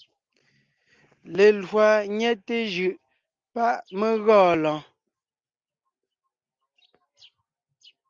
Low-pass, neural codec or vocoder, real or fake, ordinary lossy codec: 7.2 kHz; none; real; Opus, 32 kbps